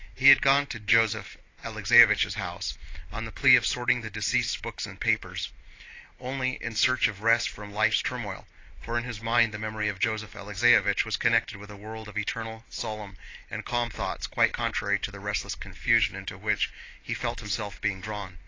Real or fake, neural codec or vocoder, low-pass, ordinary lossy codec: real; none; 7.2 kHz; AAC, 32 kbps